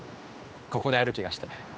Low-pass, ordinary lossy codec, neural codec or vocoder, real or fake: none; none; codec, 16 kHz, 2 kbps, X-Codec, HuBERT features, trained on general audio; fake